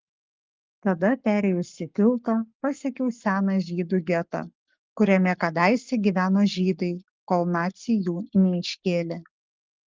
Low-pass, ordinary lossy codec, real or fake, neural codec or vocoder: 7.2 kHz; Opus, 32 kbps; fake; codec, 44.1 kHz, 7.8 kbps, Pupu-Codec